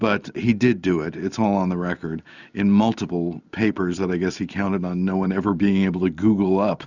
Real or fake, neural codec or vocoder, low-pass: real; none; 7.2 kHz